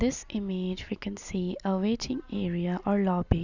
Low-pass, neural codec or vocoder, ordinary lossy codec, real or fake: 7.2 kHz; none; none; real